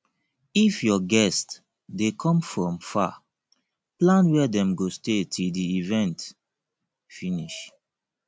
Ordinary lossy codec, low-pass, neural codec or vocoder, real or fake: none; none; none; real